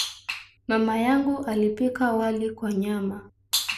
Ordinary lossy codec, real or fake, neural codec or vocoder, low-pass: none; real; none; 14.4 kHz